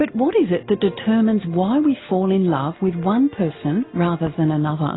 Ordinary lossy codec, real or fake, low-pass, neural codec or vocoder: AAC, 16 kbps; real; 7.2 kHz; none